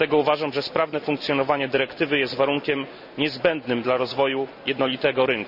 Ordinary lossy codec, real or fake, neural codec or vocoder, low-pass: none; real; none; 5.4 kHz